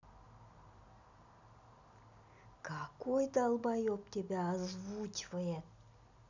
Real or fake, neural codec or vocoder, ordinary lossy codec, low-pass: real; none; none; 7.2 kHz